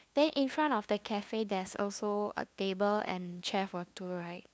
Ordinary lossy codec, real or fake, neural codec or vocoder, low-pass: none; fake; codec, 16 kHz, 2 kbps, FunCodec, trained on LibriTTS, 25 frames a second; none